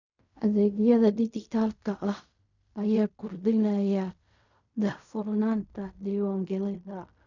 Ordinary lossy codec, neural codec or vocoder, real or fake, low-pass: none; codec, 16 kHz in and 24 kHz out, 0.4 kbps, LongCat-Audio-Codec, fine tuned four codebook decoder; fake; 7.2 kHz